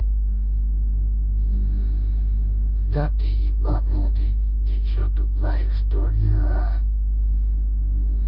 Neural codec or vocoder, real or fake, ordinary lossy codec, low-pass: codec, 16 kHz in and 24 kHz out, 0.9 kbps, LongCat-Audio-Codec, four codebook decoder; fake; none; 5.4 kHz